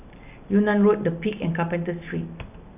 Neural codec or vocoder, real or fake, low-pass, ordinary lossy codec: none; real; 3.6 kHz; none